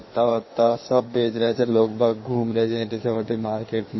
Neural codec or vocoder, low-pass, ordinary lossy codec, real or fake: codec, 16 kHz in and 24 kHz out, 1.1 kbps, FireRedTTS-2 codec; 7.2 kHz; MP3, 24 kbps; fake